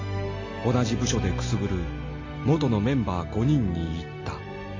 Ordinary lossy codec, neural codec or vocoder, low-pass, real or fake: MP3, 32 kbps; none; 7.2 kHz; real